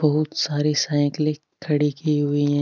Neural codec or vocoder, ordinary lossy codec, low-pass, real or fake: none; none; 7.2 kHz; real